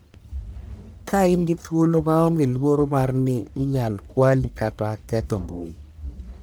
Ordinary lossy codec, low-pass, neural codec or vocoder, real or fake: none; none; codec, 44.1 kHz, 1.7 kbps, Pupu-Codec; fake